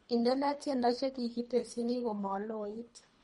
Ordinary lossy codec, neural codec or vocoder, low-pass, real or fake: MP3, 48 kbps; codec, 24 kHz, 3 kbps, HILCodec; 10.8 kHz; fake